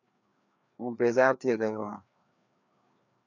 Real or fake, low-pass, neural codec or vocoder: fake; 7.2 kHz; codec, 16 kHz, 2 kbps, FreqCodec, larger model